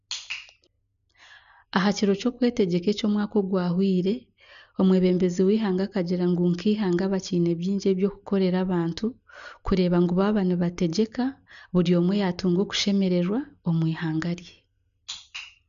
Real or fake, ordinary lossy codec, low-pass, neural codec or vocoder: real; none; 7.2 kHz; none